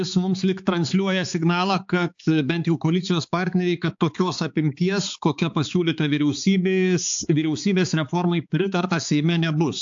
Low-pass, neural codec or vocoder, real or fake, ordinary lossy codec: 7.2 kHz; codec, 16 kHz, 4 kbps, X-Codec, HuBERT features, trained on balanced general audio; fake; AAC, 64 kbps